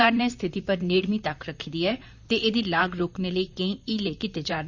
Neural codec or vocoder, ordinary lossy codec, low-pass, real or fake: vocoder, 44.1 kHz, 128 mel bands, Pupu-Vocoder; none; 7.2 kHz; fake